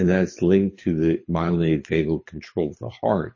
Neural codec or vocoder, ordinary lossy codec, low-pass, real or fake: codec, 24 kHz, 6 kbps, HILCodec; MP3, 32 kbps; 7.2 kHz; fake